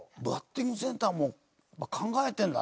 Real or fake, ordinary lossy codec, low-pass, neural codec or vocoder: real; none; none; none